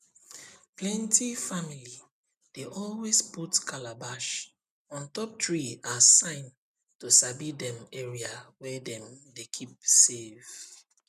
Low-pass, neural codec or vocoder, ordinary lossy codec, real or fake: 14.4 kHz; none; none; real